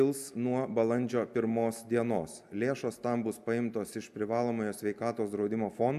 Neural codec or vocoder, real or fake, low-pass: none; real; 14.4 kHz